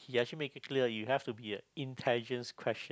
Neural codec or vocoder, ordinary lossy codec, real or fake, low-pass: none; none; real; none